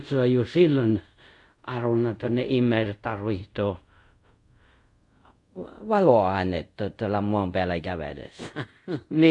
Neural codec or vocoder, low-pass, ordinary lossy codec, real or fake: codec, 24 kHz, 0.5 kbps, DualCodec; 10.8 kHz; MP3, 64 kbps; fake